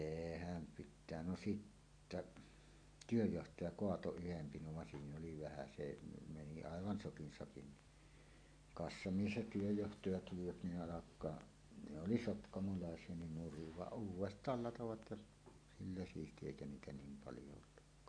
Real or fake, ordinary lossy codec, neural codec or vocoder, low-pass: real; AAC, 64 kbps; none; 9.9 kHz